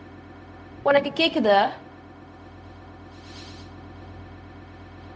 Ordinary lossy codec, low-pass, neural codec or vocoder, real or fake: none; none; codec, 16 kHz, 0.4 kbps, LongCat-Audio-Codec; fake